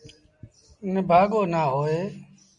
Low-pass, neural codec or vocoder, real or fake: 10.8 kHz; none; real